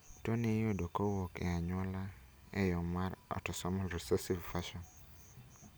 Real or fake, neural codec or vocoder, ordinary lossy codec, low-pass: real; none; none; none